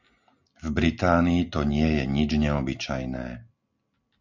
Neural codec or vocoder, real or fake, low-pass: none; real; 7.2 kHz